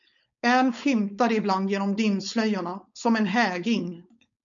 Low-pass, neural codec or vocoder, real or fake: 7.2 kHz; codec, 16 kHz, 4.8 kbps, FACodec; fake